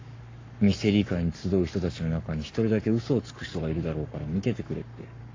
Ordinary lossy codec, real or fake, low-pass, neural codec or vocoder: AAC, 32 kbps; fake; 7.2 kHz; codec, 44.1 kHz, 7.8 kbps, Pupu-Codec